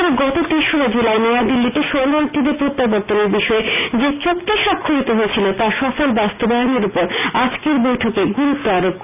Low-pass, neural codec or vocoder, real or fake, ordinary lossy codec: 3.6 kHz; none; real; none